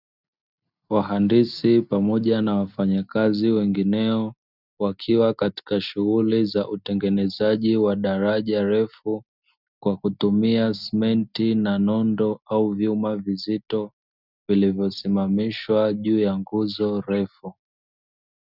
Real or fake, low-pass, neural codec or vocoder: real; 5.4 kHz; none